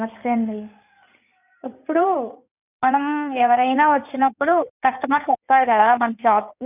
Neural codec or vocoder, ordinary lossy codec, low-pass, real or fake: codec, 16 kHz in and 24 kHz out, 2.2 kbps, FireRedTTS-2 codec; none; 3.6 kHz; fake